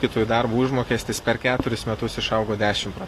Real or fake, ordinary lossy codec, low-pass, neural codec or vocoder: real; AAC, 48 kbps; 14.4 kHz; none